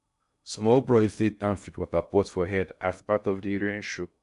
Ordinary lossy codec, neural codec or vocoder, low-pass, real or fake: none; codec, 16 kHz in and 24 kHz out, 0.6 kbps, FocalCodec, streaming, 2048 codes; 10.8 kHz; fake